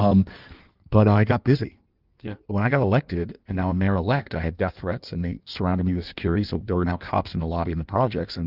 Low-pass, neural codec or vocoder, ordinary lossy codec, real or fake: 5.4 kHz; codec, 16 kHz in and 24 kHz out, 1.1 kbps, FireRedTTS-2 codec; Opus, 24 kbps; fake